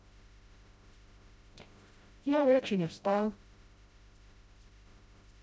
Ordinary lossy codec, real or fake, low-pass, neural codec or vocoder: none; fake; none; codec, 16 kHz, 0.5 kbps, FreqCodec, smaller model